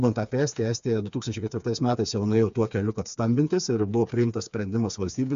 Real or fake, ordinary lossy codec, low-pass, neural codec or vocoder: fake; MP3, 64 kbps; 7.2 kHz; codec, 16 kHz, 4 kbps, FreqCodec, smaller model